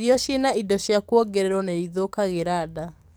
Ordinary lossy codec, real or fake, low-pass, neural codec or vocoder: none; fake; none; codec, 44.1 kHz, 7.8 kbps, DAC